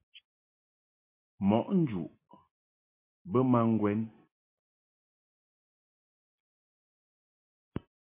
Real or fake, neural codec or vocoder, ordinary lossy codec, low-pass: real; none; MP3, 24 kbps; 3.6 kHz